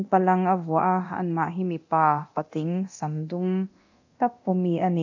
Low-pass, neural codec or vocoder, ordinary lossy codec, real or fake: 7.2 kHz; codec, 24 kHz, 0.9 kbps, DualCodec; AAC, 48 kbps; fake